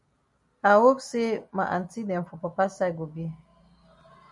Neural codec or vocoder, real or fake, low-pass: none; real; 10.8 kHz